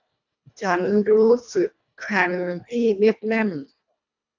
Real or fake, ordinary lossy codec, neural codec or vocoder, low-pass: fake; none; codec, 24 kHz, 1.5 kbps, HILCodec; 7.2 kHz